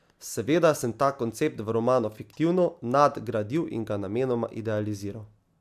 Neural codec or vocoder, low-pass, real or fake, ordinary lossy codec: none; 14.4 kHz; real; AAC, 96 kbps